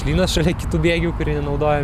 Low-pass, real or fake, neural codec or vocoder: 14.4 kHz; real; none